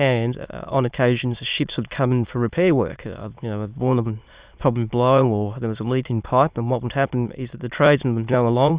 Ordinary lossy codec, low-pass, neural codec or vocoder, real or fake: Opus, 64 kbps; 3.6 kHz; autoencoder, 22.05 kHz, a latent of 192 numbers a frame, VITS, trained on many speakers; fake